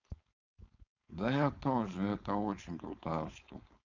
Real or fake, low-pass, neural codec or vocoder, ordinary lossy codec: fake; 7.2 kHz; codec, 16 kHz, 4.8 kbps, FACodec; MP3, 64 kbps